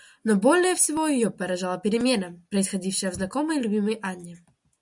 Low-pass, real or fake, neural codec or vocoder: 10.8 kHz; real; none